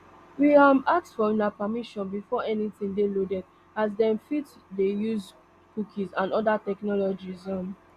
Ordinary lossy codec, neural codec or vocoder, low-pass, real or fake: Opus, 64 kbps; none; 14.4 kHz; real